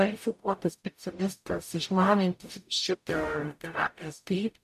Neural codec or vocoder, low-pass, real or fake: codec, 44.1 kHz, 0.9 kbps, DAC; 14.4 kHz; fake